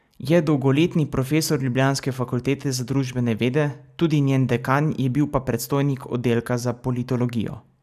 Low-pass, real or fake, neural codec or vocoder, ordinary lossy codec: 14.4 kHz; real; none; none